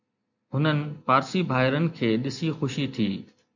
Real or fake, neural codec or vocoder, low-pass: real; none; 7.2 kHz